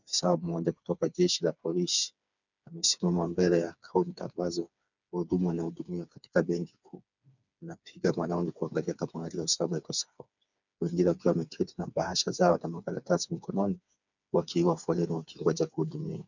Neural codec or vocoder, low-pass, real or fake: codec, 16 kHz, 4 kbps, FreqCodec, smaller model; 7.2 kHz; fake